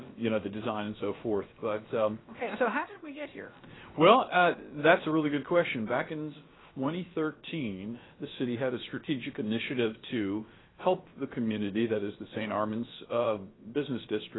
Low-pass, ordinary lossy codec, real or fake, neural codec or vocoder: 7.2 kHz; AAC, 16 kbps; fake; codec, 16 kHz, about 1 kbps, DyCAST, with the encoder's durations